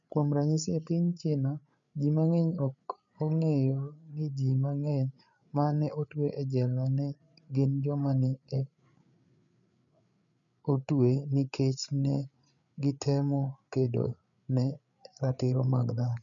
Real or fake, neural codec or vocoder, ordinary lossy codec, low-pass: fake; codec, 16 kHz, 8 kbps, FreqCodec, larger model; none; 7.2 kHz